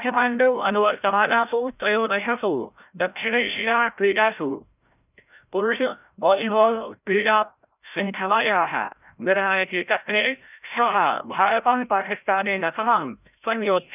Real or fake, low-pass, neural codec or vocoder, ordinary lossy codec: fake; 3.6 kHz; codec, 16 kHz, 0.5 kbps, FreqCodec, larger model; none